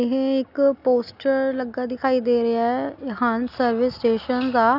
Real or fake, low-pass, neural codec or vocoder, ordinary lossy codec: real; 5.4 kHz; none; none